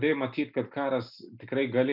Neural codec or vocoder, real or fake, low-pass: none; real; 5.4 kHz